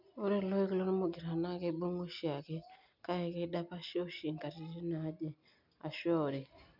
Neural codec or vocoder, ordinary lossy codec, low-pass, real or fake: none; none; 5.4 kHz; real